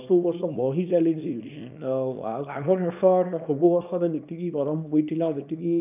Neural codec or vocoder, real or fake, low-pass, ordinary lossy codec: codec, 24 kHz, 0.9 kbps, WavTokenizer, small release; fake; 3.6 kHz; none